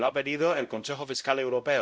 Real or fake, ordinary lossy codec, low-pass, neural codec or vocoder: fake; none; none; codec, 16 kHz, 0.5 kbps, X-Codec, WavLM features, trained on Multilingual LibriSpeech